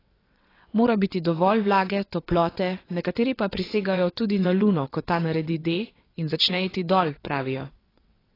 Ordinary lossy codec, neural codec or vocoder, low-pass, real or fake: AAC, 24 kbps; vocoder, 22.05 kHz, 80 mel bands, WaveNeXt; 5.4 kHz; fake